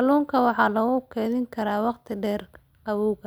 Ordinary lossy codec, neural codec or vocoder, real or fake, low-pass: none; none; real; none